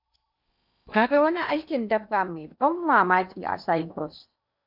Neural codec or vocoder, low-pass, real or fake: codec, 16 kHz in and 24 kHz out, 0.8 kbps, FocalCodec, streaming, 65536 codes; 5.4 kHz; fake